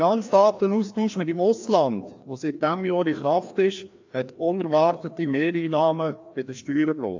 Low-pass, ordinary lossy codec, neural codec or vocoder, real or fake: 7.2 kHz; AAC, 48 kbps; codec, 16 kHz, 1 kbps, FreqCodec, larger model; fake